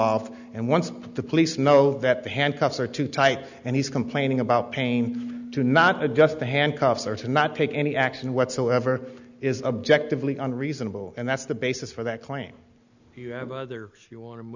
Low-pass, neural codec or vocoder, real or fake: 7.2 kHz; none; real